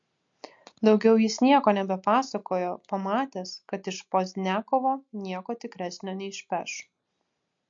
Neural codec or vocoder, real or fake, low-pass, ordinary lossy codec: none; real; 7.2 kHz; MP3, 48 kbps